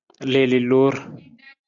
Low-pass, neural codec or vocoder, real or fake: 7.2 kHz; none; real